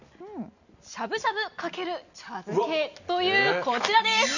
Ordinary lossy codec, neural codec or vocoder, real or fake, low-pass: none; none; real; 7.2 kHz